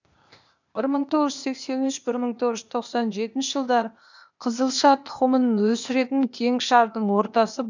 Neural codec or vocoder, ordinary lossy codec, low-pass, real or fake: codec, 16 kHz, 0.8 kbps, ZipCodec; none; 7.2 kHz; fake